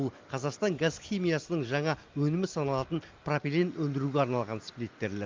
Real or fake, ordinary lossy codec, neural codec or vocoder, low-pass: real; Opus, 32 kbps; none; 7.2 kHz